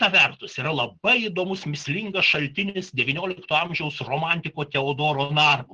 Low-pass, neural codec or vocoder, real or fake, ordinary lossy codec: 10.8 kHz; none; real; Opus, 16 kbps